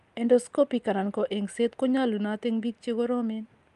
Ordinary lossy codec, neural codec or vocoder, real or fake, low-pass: Opus, 32 kbps; none; real; 10.8 kHz